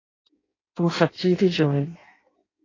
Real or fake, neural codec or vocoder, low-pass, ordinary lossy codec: fake; codec, 16 kHz in and 24 kHz out, 0.6 kbps, FireRedTTS-2 codec; 7.2 kHz; AAC, 32 kbps